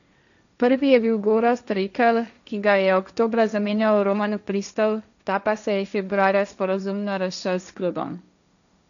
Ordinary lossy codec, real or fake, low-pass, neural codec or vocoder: none; fake; 7.2 kHz; codec, 16 kHz, 1.1 kbps, Voila-Tokenizer